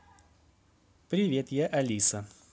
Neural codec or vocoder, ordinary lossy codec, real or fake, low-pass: none; none; real; none